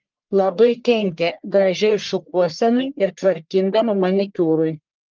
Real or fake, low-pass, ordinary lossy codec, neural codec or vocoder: fake; 7.2 kHz; Opus, 24 kbps; codec, 44.1 kHz, 1.7 kbps, Pupu-Codec